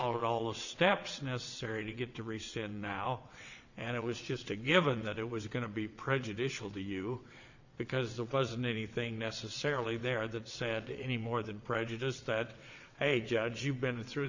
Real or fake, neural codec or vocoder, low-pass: fake; vocoder, 22.05 kHz, 80 mel bands, WaveNeXt; 7.2 kHz